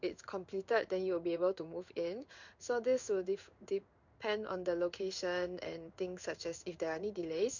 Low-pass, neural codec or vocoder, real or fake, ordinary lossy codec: 7.2 kHz; codec, 16 kHz in and 24 kHz out, 1 kbps, XY-Tokenizer; fake; none